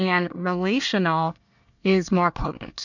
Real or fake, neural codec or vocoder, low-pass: fake; codec, 24 kHz, 1 kbps, SNAC; 7.2 kHz